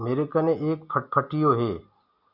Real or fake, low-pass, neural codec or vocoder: real; 5.4 kHz; none